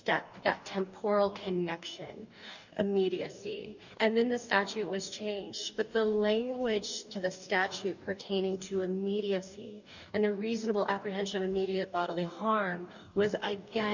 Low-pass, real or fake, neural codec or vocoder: 7.2 kHz; fake; codec, 44.1 kHz, 2.6 kbps, DAC